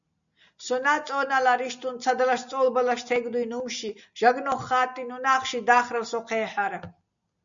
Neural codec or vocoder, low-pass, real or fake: none; 7.2 kHz; real